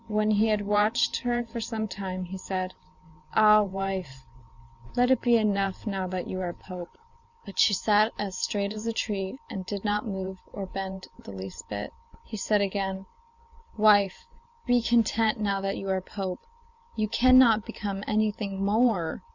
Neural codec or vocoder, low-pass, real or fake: vocoder, 44.1 kHz, 128 mel bands every 512 samples, BigVGAN v2; 7.2 kHz; fake